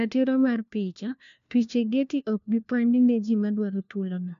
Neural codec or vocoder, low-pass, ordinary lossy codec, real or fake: codec, 16 kHz, 1 kbps, FunCodec, trained on LibriTTS, 50 frames a second; 7.2 kHz; AAC, 96 kbps; fake